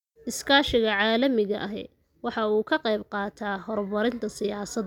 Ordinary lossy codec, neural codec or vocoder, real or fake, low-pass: none; none; real; 19.8 kHz